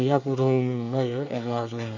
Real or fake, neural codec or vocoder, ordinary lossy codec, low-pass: fake; codec, 24 kHz, 1 kbps, SNAC; AAC, 48 kbps; 7.2 kHz